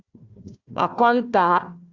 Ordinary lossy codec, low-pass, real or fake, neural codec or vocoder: Opus, 64 kbps; 7.2 kHz; fake; codec, 16 kHz, 1 kbps, FunCodec, trained on Chinese and English, 50 frames a second